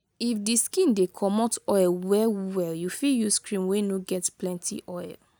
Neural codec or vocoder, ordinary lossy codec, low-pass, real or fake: none; none; none; real